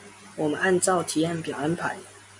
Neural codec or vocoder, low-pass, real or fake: none; 10.8 kHz; real